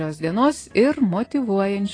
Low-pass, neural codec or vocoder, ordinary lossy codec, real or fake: 9.9 kHz; none; AAC, 32 kbps; real